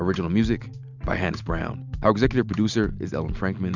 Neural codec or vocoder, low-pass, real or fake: none; 7.2 kHz; real